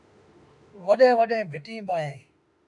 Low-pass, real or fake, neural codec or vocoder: 10.8 kHz; fake; autoencoder, 48 kHz, 32 numbers a frame, DAC-VAE, trained on Japanese speech